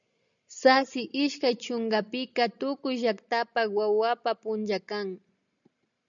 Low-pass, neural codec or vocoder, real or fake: 7.2 kHz; none; real